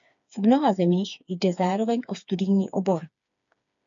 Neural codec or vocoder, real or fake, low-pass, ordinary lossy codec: codec, 16 kHz, 4 kbps, FreqCodec, smaller model; fake; 7.2 kHz; AAC, 64 kbps